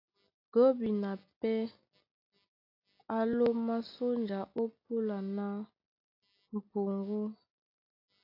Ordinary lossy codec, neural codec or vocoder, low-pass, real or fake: AAC, 32 kbps; none; 5.4 kHz; real